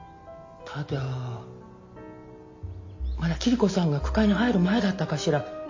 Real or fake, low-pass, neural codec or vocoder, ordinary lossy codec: real; 7.2 kHz; none; MP3, 48 kbps